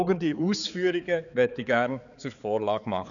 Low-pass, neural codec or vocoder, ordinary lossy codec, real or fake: 7.2 kHz; codec, 16 kHz, 4 kbps, X-Codec, HuBERT features, trained on balanced general audio; none; fake